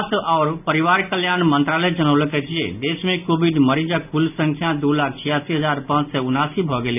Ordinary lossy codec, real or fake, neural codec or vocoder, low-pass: none; real; none; 3.6 kHz